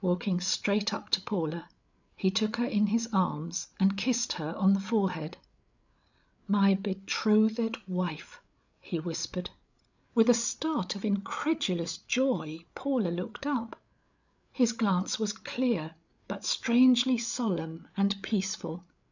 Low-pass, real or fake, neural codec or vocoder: 7.2 kHz; fake; codec, 16 kHz, 8 kbps, FreqCodec, larger model